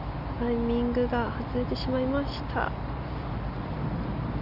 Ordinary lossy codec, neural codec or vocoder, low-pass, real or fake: none; none; 5.4 kHz; real